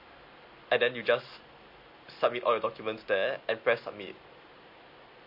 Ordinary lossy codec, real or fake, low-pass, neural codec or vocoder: MP3, 32 kbps; real; 5.4 kHz; none